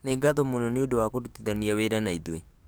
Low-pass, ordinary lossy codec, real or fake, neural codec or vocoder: none; none; fake; codec, 44.1 kHz, 7.8 kbps, DAC